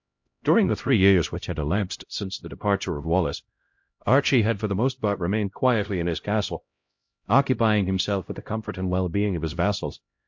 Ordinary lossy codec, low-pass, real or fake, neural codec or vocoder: MP3, 48 kbps; 7.2 kHz; fake; codec, 16 kHz, 0.5 kbps, X-Codec, HuBERT features, trained on LibriSpeech